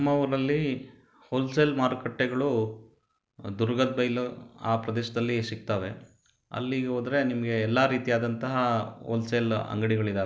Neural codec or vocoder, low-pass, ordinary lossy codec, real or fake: none; none; none; real